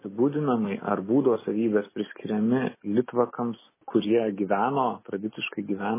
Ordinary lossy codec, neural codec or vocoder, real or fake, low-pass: MP3, 16 kbps; none; real; 3.6 kHz